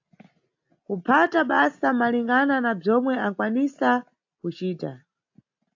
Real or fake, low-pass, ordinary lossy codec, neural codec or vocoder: real; 7.2 kHz; AAC, 48 kbps; none